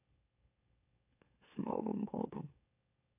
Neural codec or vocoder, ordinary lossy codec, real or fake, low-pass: autoencoder, 44.1 kHz, a latent of 192 numbers a frame, MeloTTS; AAC, 32 kbps; fake; 3.6 kHz